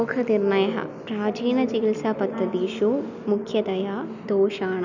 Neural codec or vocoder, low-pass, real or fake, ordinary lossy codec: none; 7.2 kHz; real; none